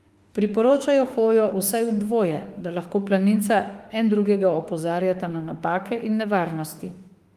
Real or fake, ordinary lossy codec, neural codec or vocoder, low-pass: fake; Opus, 32 kbps; autoencoder, 48 kHz, 32 numbers a frame, DAC-VAE, trained on Japanese speech; 14.4 kHz